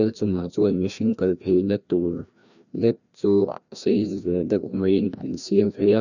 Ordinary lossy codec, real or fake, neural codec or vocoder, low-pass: none; fake; codec, 16 kHz, 1 kbps, FreqCodec, larger model; 7.2 kHz